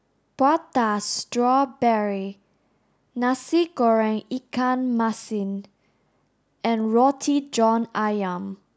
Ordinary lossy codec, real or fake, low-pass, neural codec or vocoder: none; real; none; none